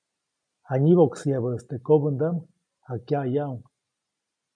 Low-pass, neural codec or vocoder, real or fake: 9.9 kHz; none; real